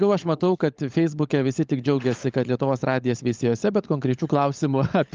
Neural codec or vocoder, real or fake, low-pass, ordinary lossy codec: codec, 16 kHz, 16 kbps, FunCodec, trained on LibriTTS, 50 frames a second; fake; 7.2 kHz; Opus, 24 kbps